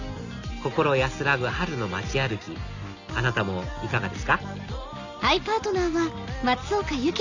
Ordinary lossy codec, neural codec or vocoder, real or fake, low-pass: none; none; real; 7.2 kHz